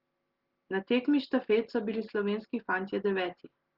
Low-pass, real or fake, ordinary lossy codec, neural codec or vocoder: 5.4 kHz; real; Opus, 16 kbps; none